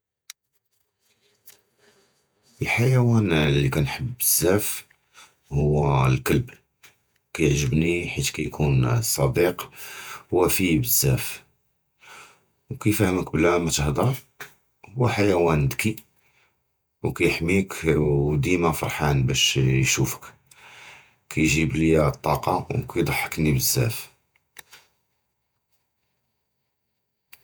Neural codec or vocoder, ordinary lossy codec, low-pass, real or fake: vocoder, 48 kHz, 128 mel bands, Vocos; none; none; fake